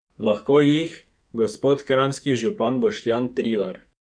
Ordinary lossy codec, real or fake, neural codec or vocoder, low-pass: none; fake; codec, 44.1 kHz, 2.6 kbps, SNAC; 9.9 kHz